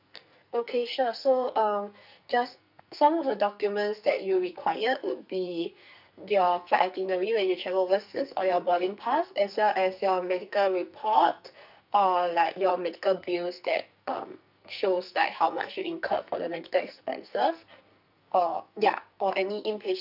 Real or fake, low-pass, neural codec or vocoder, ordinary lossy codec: fake; 5.4 kHz; codec, 44.1 kHz, 2.6 kbps, SNAC; none